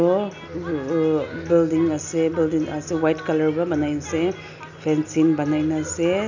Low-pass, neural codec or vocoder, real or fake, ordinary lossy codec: 7.2 kHz; none; real; none